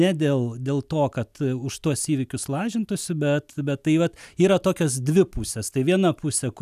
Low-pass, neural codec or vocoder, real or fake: 14.4 kHz; none; real